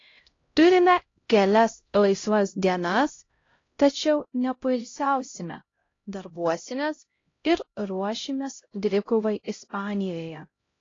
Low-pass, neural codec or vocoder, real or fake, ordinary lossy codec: 7.2 kHz; codec, 16 kHz, 0.5 kbps, X-Codec, HuBERT features, trained on LibriSpeech; fake; AAC, 32 kbps